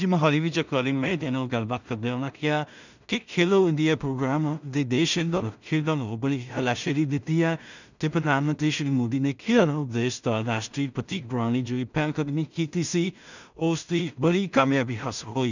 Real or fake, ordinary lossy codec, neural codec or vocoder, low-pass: fake; none; codec, 16 kHz in and 24 kHz out, 0.4 kbps, LongCat-Audio-Codec, two codebook decoder; 7.2 kHz